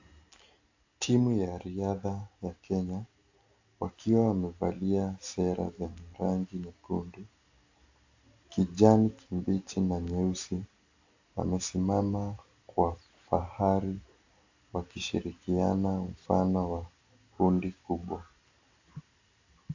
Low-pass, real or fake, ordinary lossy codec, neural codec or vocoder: 7.2 kHz; real; AAC, 48 kbps; none